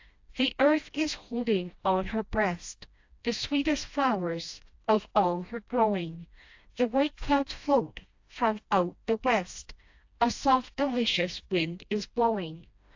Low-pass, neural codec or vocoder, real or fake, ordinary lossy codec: 7.2 kHz; codec, 16 kHz, 1 kbps, FreqCodec, smaller model; fake; AAC, 48 kbps